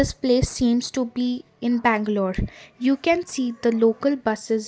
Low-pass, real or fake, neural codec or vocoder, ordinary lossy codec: none; real; none; none